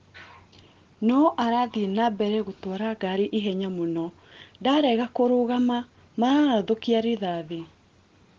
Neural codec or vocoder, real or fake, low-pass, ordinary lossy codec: none; real; 7.2 kHz; Opus, 16 kbps